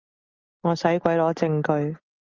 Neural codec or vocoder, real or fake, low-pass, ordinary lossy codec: none; real; 7.2 kHz; Opus, 24 kbps